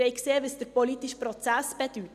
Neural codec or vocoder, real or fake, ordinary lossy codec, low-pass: none; real; none; 14.4 kHz